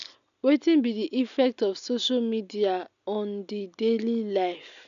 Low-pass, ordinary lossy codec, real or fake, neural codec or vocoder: 7.2 kHz; none; real; none